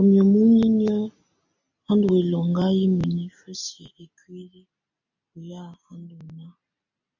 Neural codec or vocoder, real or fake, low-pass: none; real; 7.2 kHz